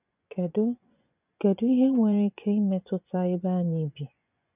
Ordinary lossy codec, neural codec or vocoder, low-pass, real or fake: none; vocoder, 24 kHz, 100 mel bands, Vocos; 3.6 kHz; fake